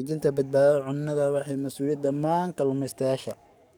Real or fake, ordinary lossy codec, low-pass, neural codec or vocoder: fake; none; 19.8 kHz; codec, 44.1 kHz, 7.8 kbps, DAC